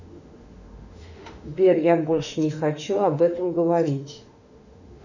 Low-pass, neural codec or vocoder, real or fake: 7.2 kHz; autoencoder, 48 kHz, 32 numbers a frame, DAC-VAE, trained on Japanese speech; fake